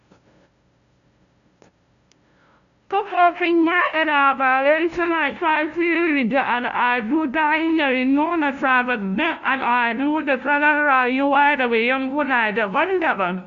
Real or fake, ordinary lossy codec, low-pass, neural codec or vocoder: fake; none; 7.2 kHz; codec, 16 kHz, 0.5 kbps, FunCodec, trained on LibriTTS, 25 frames a second